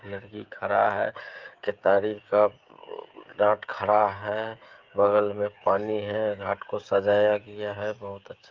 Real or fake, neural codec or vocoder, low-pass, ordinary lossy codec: fake; codec, 16 kHz, 16 kbps, FreqCodec, smaller model; 7.2 kHz; Opus, 32 kbps